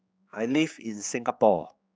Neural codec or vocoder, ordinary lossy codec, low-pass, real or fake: codec, 16 kHz, 4 kbps, X-Codec, HuBERT features, trained on general audio; none; none; fake